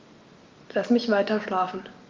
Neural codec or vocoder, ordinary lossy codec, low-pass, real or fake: none; Opus, 24 kbps; 7.2 kHz; real